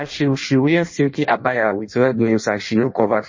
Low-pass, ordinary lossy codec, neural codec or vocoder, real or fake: 7.2 kHz; MP3, 32 kbps; codec, 16 kHz in and 24 kHz out, 0.6 kbps, FireRedTTS-2 codec; fake